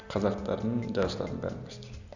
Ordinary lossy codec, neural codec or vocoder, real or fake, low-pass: none; none; real; 7.2 kHz